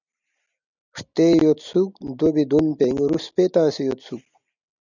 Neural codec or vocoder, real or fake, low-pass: none; real; 7.2 kHz